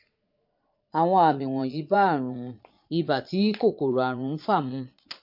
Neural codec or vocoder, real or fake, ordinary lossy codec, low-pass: vocoder, 22.05 kHz, 80 mel bands, Vocos; fake; MP3, 48 kbps; 5.4 kHz